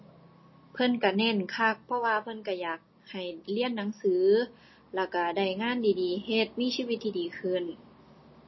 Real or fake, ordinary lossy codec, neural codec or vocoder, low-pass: real; MP3, 24 kbps; none; 7.2 kHz